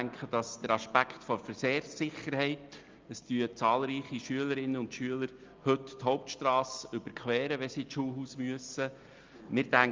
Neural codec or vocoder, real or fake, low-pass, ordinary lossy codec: none; real; 7.2 kHz; Opus, 24 kbps